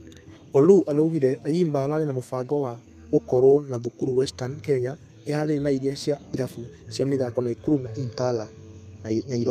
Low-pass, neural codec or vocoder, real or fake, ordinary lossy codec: 14.4 kHz; codec, 32 kHz, 1.9 kbps, SNAC; fake; none